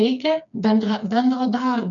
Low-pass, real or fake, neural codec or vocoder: 7.2 kHz; fake; codec, 16 kHz, 4 kbps, FreqCodec, smaller model